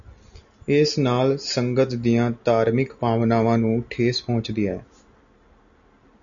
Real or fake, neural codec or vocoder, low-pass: real; none; 7.2 kHz